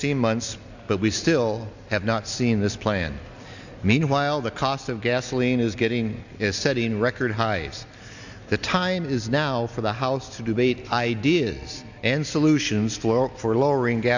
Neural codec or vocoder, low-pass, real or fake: none; 7.2 kHz; real